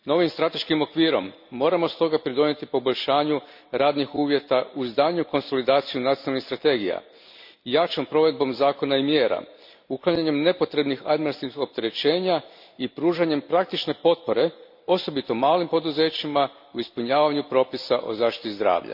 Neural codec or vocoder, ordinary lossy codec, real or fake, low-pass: none; MP3, 48 kbps; real; 5.4 kHz